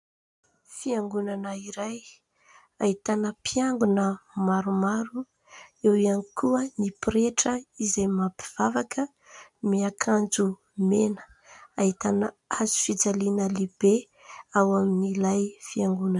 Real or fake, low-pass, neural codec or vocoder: real; 10.8 kHz; none